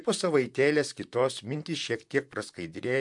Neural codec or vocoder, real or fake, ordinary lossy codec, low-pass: vocoder, 44.1 kHz, 128 mel bands, Pupu-Vocoder; fake; MP3, 64 kbps; 10.8 kHz